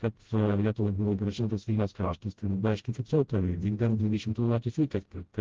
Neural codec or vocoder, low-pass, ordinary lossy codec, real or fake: codec, 16 kHz, 0.5 kbps, FreqCodec, smaller model; 7.2 kHz; Opus, 16 kbps; fake